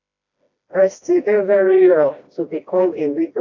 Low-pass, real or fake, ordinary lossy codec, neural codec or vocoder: 7.2 kHz; fake; AAC, 48 kbps; codec, 16 kHz, 1 kbps, FreqCodec, smaller model